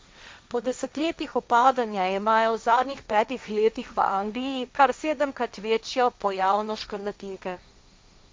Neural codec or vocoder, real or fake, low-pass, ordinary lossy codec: codec, 16 kHz, 1.1 kbps, Voila-Tokenizer; fake; none; none